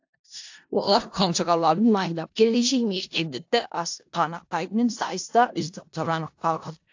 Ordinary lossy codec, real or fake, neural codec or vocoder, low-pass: AAC, 48 kbps; fake; codec, 16 kHz in and 24 kHz out, 0.4 kbps, LongCat-Audio-Codec, four codebook decoder; 7.2 kHz